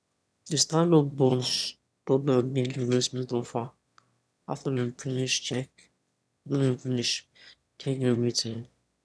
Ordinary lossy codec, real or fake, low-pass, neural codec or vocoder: none; fake; none; autoencoder, 22.05 kHz, a latent of 192 numbers a frame, VITS, trained on one speaker